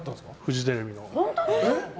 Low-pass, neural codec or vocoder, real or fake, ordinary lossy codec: none; none; real; none